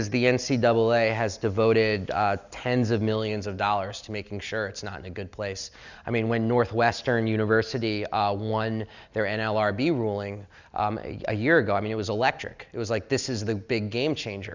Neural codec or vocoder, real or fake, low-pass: none; real; 7.2 kHz